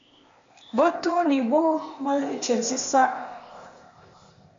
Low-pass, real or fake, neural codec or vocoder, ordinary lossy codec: 7.2 kHz; fake; codec, 16 kHz, 0.8 kbps, ZipCodec; MP3, 48 kbps